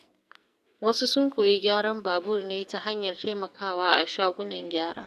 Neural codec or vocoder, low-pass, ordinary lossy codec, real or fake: codec, 44.1 kHz, 2.6 kbps, SNAC; 14.4 kHz; none; fake